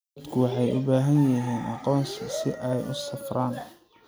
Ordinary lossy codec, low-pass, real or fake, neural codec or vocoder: none; none; real; none